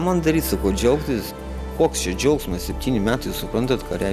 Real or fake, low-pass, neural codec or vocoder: real; 14.4 kHz; none